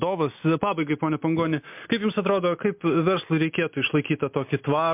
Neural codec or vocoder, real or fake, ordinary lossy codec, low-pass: none; real; MP3, 32 kbps; 3.6 kHz